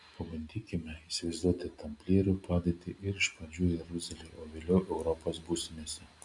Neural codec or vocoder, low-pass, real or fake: none; 10.8 kHz; real